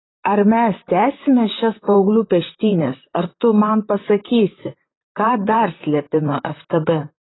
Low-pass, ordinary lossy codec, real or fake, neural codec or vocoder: 7.2 kHz; AAC, 16 kbps; fake; vocoder, 44.1 kHz, 128 mel bands, Pupu-Vocoder